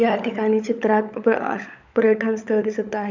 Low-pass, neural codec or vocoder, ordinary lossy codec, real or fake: 7.2 kHz; codec, 16 kHz, 16 kbps, FunCodec, trained on LibriTTS, 50 frames a second; none; fake